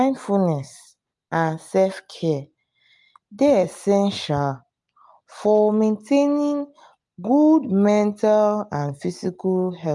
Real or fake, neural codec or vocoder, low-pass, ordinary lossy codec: real; none; 10.8 kHz; MP3, 64 kbps